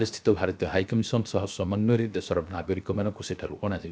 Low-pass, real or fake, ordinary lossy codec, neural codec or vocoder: none; fake; none; codec, 16 kHz, 0.7 kbps, FocalCodec